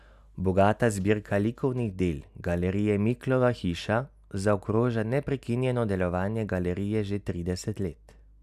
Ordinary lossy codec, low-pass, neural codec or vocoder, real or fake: none; 14.4 kHz; none; real